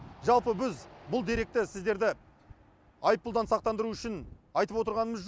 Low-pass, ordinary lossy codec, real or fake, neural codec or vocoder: none; none; real; none